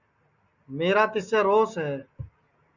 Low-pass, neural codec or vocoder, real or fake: 7.2 kHz; none; real